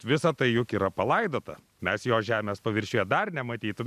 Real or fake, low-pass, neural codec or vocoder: real; 14.4 kHz; none